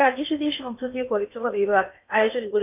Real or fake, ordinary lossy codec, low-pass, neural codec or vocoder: fake; none; 3.6 kHz; codec, 16 kHz in and 24 kHz out, 0.8 kbps, FocalCodec, streaming, 65536 codes